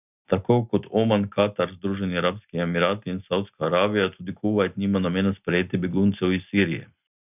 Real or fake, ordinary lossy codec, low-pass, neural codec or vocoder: real; none; 3.6 kHz; none